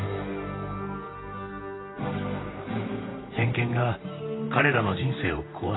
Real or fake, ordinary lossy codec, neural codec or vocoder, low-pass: fake; AAC, 16 kbps; vocoder, 44.1 kHz, 128 mel bands, Pupu-Vocoder; 7.2 kHz